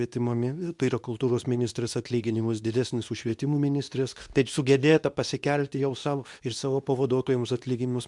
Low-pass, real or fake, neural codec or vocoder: 10.8 kHz; fake; codec, 24 kHz, 0.9 kbps, WavTokenizer, medium speech release version 2